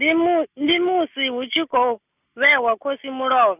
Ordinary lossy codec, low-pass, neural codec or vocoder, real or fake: none; 3.6 kHz; none; real